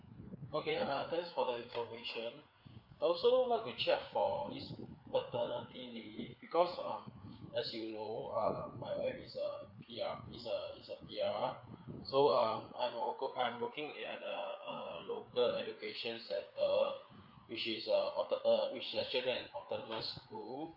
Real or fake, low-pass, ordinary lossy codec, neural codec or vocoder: fake; 5.4 kHz; none; codec, 16 kHz, 4 kbps, FreqCodec, larger model